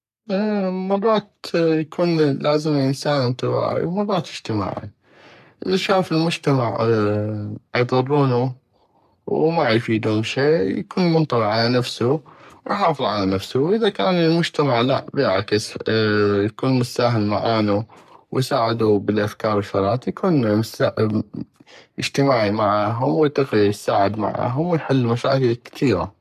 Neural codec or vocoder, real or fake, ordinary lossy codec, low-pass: codec, 44.1 kHz, 3.4 kbps, Pupu-Codec; fake; none; 14.4 kHz